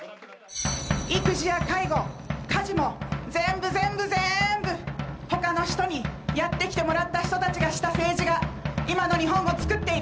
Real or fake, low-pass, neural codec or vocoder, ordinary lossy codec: real; none; none; none